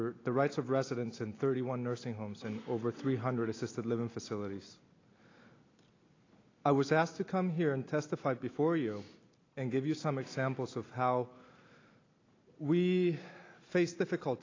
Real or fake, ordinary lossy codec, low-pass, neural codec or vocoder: real; AAC, 32 kbps; 7.2 kHz; none